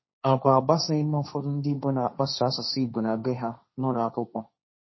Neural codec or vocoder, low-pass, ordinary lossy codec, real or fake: codec, 16 kHz, 1.1 kbps, Voila-Tokenizer; 7.2 kHz; MP3, 24 kbps; fake